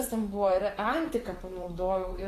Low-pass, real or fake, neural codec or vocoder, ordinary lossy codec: 14.4 kHz; fake; vocoder, 44.1 kHz, 128 mel bands, Pupu-Vocoder; AAC, 48 kbps